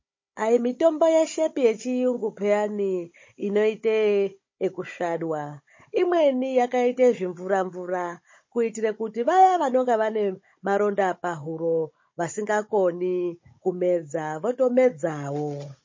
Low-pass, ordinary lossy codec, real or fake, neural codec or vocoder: 7.2 kHz; MP3, 32 kbps; fake; codec, 16 kHz, 16 kbps, FunCodec, trained on Chinese and English, 50 frames a second